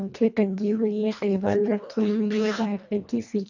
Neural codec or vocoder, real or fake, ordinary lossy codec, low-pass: codec, 24 kHz, 1.5 kbps, HILCodec; fake; none; 7.2 kHz